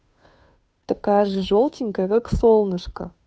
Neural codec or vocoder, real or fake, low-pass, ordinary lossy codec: codec, 16 kHz, 2 kbps, FunCodec, trained on Chinese and English, 25 frames a second; fake; none; none